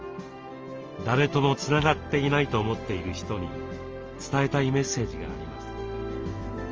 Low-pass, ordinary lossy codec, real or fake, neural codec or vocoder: 7.2 kHz; Opus, 24 kbps; real; none